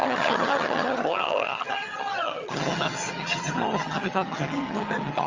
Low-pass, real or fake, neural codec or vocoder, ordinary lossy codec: 7.2 kHz; fake; vocoder, 22.05 kHz, 80 mel bands, HiFi-GAN; Opus, 32 kbps